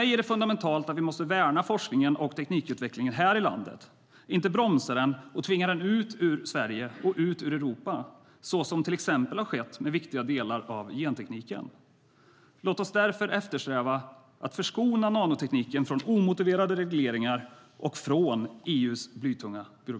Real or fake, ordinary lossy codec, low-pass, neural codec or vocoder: real; none; none; none